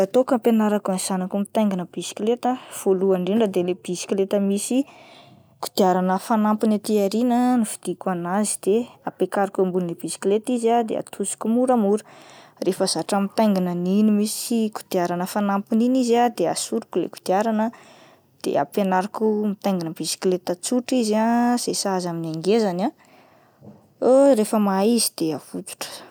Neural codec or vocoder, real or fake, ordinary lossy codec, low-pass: none; real; none; none